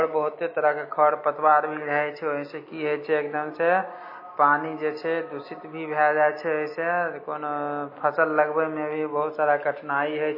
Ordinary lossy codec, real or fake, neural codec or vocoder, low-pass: MP3, 24 kbps; real; none; 5.4 kHz